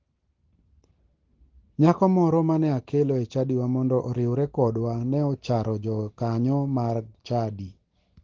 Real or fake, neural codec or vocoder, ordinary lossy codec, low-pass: real; none; Opus, 16 kbps; 7.2 kHz